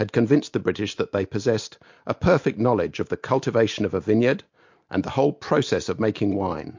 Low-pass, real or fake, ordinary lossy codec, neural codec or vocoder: 7.2 kHz; real; MP3, 48 kbps; none